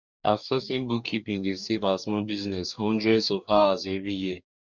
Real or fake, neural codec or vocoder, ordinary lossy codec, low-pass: fake; codec, 44.1 kHz, 2.6 kbps, DAC; none; 7.2 kHz